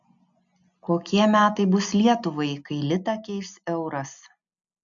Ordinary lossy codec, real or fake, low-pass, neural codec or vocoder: MP3, 96 kbps; real; 7.2 kHz; none